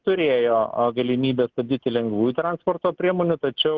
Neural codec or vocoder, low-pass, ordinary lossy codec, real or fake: none; 7.2 kHz; Opus, 16 kbps; real